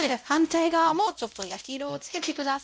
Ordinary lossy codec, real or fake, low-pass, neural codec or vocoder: none; fake; none; codec, 16 kHz, 0.5 kbps, X-Codec, WavLM features, trained on Multilingual LibriSpeech